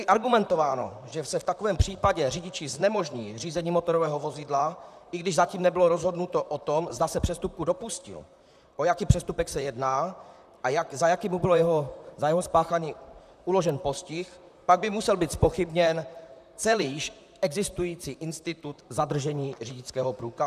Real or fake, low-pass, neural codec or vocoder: fake; 14.4 kHz; vocoder, 44.1 kHz, 128 mel bands, Pupu-Vocoder